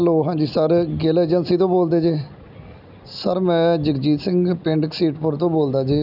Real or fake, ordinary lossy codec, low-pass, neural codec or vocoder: real; none; 5.4 kHz; none